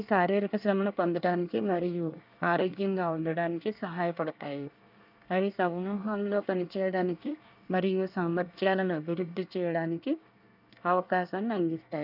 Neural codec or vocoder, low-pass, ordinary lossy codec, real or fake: codec, 24 kHz, 1 kbps, SNAC; 5.4 kHz; none; fake